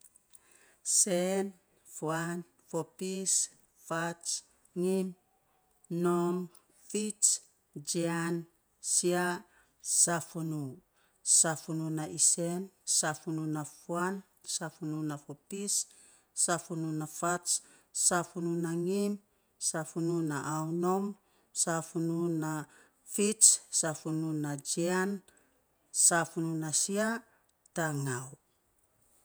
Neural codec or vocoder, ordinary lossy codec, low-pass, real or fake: vocoder, 48 kHz, 128 mel bands, Vocos; none; none; fake